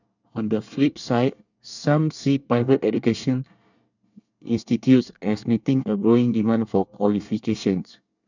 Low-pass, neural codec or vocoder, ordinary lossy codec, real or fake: 7.2 kHz; codec, 24 kHz, 1 kbps, SNAC; none; fake